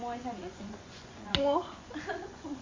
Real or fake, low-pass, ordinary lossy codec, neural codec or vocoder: fake; 7.2 kHz; MP3, 48 kbps; vocoder, 24 kHz, 100 mel bands, Vocos